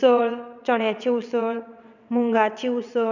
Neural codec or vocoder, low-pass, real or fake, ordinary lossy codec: vocoder, 22.05 kHz, 80 mel bands, WaveNeXt; 7.2 kHz; fake; none